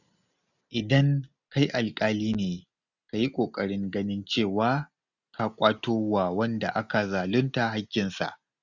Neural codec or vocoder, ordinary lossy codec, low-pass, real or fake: none; none; 7.2 kHz; real